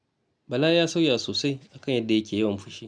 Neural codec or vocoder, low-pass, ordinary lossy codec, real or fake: none; none; none; real